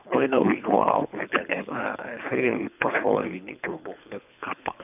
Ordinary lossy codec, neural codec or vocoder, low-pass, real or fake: none; codec, 24 kHz, 3 kbps, HILCodec; 3.6 kHz; fake